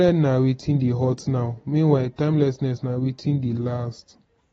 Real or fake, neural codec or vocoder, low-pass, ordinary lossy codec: real; none; 14.4 kHz; AAC, 24 kbps